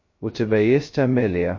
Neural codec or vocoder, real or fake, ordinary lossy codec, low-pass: codec, 16 kHz, 0.2 kbps, FocalCodec; fake; MP3, 32 kbps; 7.2 kHz